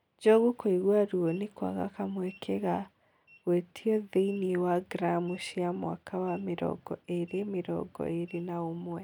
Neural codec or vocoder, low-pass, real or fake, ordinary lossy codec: none; 19.8 kHz; real; none